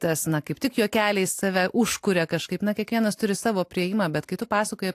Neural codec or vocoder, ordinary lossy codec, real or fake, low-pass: none; AAC, 64 kbps; real; 14.4 kHz